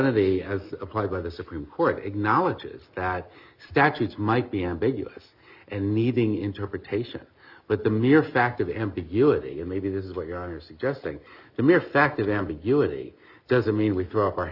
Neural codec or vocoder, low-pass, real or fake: none; 5.4 kHz; real